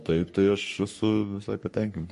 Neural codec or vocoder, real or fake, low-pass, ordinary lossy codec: codec, 44.1 kHz, 3.4 kbps, Pupu-Codec; fake; 14.4 kHz; MP3, 48 kbps